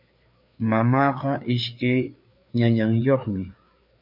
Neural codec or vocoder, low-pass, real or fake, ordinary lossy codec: codec, 16 kHz, 4 kbps, FreqCodec, larger model; 5.4 kHz; fake; MP3, 48 kbps